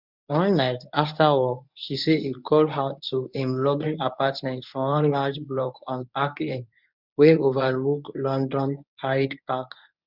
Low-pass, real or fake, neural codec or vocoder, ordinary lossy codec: 5.4 kHz; fake; codec, 24 kHz, 0.9 kbps, WavTokenizer, medium speech release version 1; none